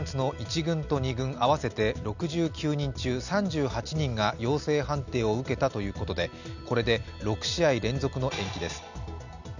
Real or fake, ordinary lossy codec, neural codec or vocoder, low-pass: real; none; none; 7.2 kHz